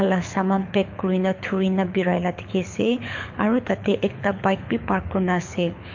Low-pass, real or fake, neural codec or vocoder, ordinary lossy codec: 7.2 kHz; fake; codec, 24 kHz, 6 kbps, HILCodec; MP3, 48 kbps